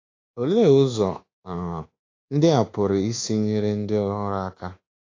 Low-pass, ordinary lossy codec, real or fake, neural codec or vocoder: 7.2 kHz; AAC, 32 kbps; fake; codec, 24 kHz, 1.2 kbps, DualCodec